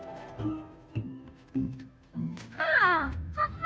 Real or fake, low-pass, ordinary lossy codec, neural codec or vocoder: fake; none; none; codec, 16 kHz, 0.5 kbps, FunCodec, trained on Chinese and English, 25 frames a second